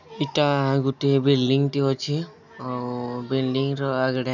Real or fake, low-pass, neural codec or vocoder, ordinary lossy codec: real; 7.2 kHz; none; none